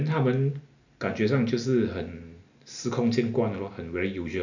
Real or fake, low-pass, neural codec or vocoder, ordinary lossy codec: real; 7.2 kHz; none; none